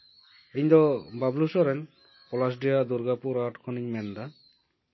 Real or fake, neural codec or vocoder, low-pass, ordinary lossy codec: real; none; 7.2 kHz; MP3, 24 kbps